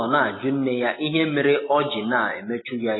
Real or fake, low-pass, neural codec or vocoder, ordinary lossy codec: real; 7.2 kHz; none; AAC, 16 kbps